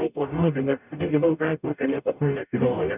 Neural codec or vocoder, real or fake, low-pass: codec, 44.1 kHz, 0.9 kbps, DAC; fake; 3.6 kHz